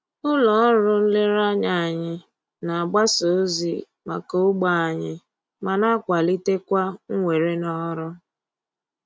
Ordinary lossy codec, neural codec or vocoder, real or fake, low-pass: none; none; real; none